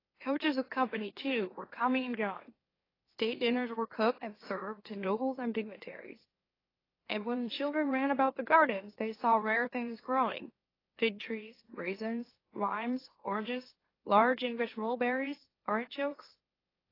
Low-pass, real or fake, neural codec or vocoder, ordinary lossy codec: 5.4 kHz; fake; autoencoder, 44.1 kHz, a latent of 192 numbers a frame, MeloTTS; AAC, 24 kbps